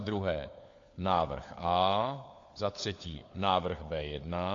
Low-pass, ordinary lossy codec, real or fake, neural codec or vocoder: 7.2 kHz; AAC, 48 kbps; fake; codec, 16 kHz, 4 kbps, FunCodec, trained on LibriTTS, 50 frames a second